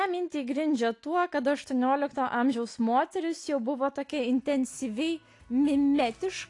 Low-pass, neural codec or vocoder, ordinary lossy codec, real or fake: 10.8 kHz; none; AAC, 48 kbps; real